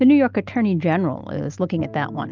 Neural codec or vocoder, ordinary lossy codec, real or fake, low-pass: none; Opus, 24 kbps; real; 7.2 kHz